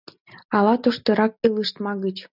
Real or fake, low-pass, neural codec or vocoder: real; 5.4 kHz; none